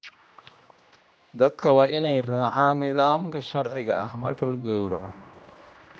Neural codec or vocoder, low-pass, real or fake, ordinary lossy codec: codec, 16 kHz, 1 kbps, X-Codec, HuBERT features, trained on general audio; none; fake; none